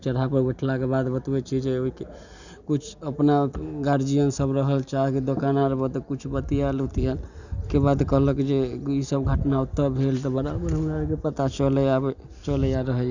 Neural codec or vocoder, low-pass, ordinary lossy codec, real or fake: none; 7.2 kHz; none; real